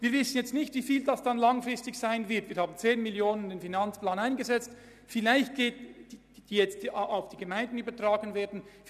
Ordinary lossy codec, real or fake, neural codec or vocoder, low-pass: none; real; none; 14.4 kHz